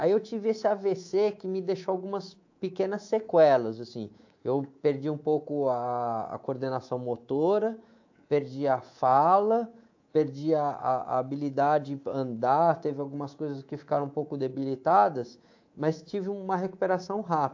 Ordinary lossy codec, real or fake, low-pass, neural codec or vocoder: AAC, 48 kbps; fake; 7.2 kHz; codec, 24 kHz, 3.1 kbps, DualCodec